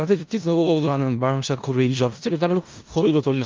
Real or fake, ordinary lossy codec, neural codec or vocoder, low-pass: fake; Opus, 32 kbps; codec, 16 kHz in and 24 kHz out, 0.4 kbps, LongCat-Audio-Codec, four codebook decoder; 7.2 kHz